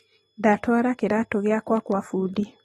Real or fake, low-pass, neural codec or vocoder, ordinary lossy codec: real; 19.8 kHz; none; AAC, 32 kbps